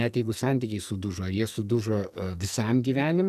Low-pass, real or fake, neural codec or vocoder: 14.4 kHz; fake; codec, 44.1 kHz, 2.6 kbps, SNAC